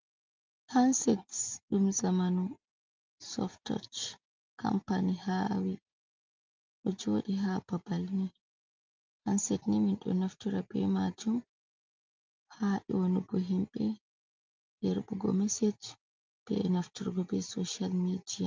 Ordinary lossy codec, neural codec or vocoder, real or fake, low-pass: Opus, 32 kbps; none; real; 7.2 kHz